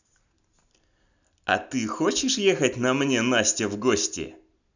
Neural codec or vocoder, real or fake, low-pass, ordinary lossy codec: none; real; 7.2 kHz; none